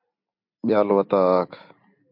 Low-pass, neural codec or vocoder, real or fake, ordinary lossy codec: 5.4 kHz; vocoder, 44.1 kHz, 128 mel bands every 256 samples, BigVGAN v2; fake; MP3, 48 kbps